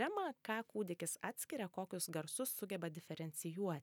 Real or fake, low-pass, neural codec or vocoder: real; 19.8 kHz; none